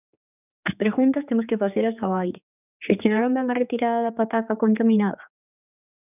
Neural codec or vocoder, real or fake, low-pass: codec, 16 kHz, 4 kbps, X-Codec, HuBERT features, trained on general audio; fake; 3.6 kHz